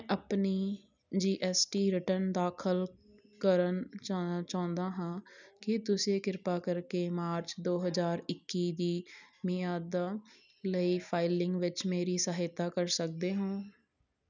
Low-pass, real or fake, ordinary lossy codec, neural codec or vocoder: 7.2 kHz; real; none; none